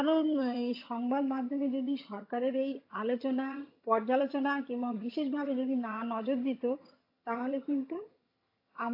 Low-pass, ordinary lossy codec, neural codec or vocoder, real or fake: 5.4 kHz; AAC, 32 kbps; vocoder, 22.05 kHz, 80 mel bands, HiFi-GAN; fake